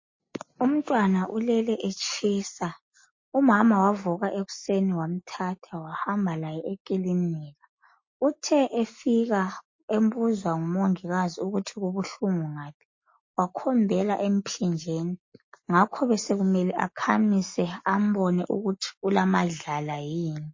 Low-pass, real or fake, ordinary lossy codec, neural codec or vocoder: 7.2 kHz; real; MP3, 32 kbps; none